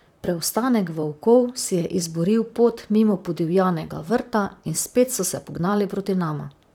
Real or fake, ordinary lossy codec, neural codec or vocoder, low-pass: fake; none; vocoder, 44.1 kHz, 128 mel bands, Pupu-Vocoder; 19.8 kHz